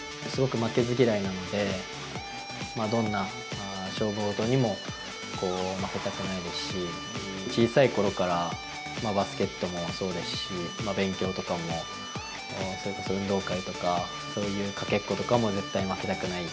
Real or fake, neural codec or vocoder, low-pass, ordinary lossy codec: real; none; none; none